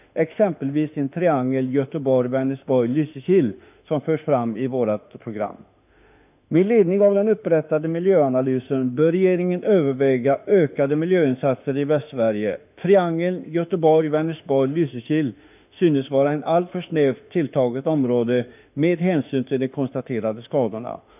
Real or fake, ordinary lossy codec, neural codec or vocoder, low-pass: fake; none; autoencoder, 48 kHz, 32 numbers a frame, DAC-VAE, trained on Japanese speech; 3.6 kHz